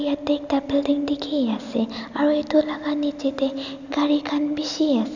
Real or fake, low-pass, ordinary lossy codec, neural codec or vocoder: real; 7.2 kHz; none; none